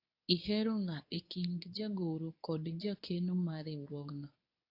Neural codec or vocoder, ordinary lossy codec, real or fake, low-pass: codec, 24 kHz, 0.9 kbps, WavTokenizer, medium speech release version 2; AAC, 32 kbps; fake; 5.4 kHz